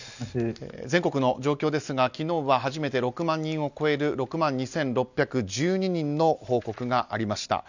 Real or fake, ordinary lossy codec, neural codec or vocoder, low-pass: real; none; none; 7.2 kHz